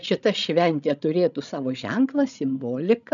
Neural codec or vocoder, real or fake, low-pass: codec, 16 kHz, 16 kbps, FreqCodec, larger model; fake; 7.2 kHz